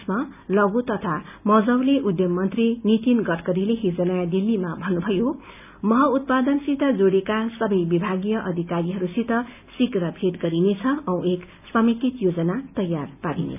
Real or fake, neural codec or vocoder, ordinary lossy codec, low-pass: real; none; none; 3.6 kHz